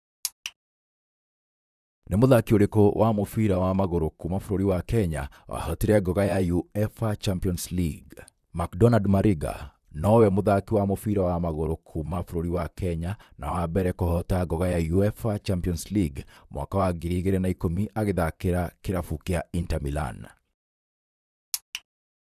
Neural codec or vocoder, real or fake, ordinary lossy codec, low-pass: vocoder, 44.1 kHz, 128 mel bands, Pupu-Vocoder; fake; none; 14.4 kHz